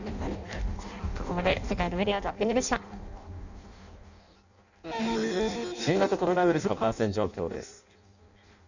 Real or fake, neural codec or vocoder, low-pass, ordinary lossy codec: fake; codec, 16 kHz in and 24 kHz out, 0.6 kbps, FireRedTTS-2 codec; 7.2 kHz; none